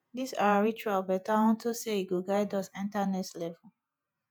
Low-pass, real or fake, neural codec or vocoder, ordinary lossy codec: none; fake; vocoder, 48 kHz, 128 mel bands, Vocos; none